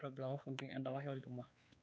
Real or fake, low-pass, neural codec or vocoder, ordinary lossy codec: fake; none; codec, 16 kHz, 4 kbps, X-Codec, WavLM features, trained on Multilingual LibriSpeech; none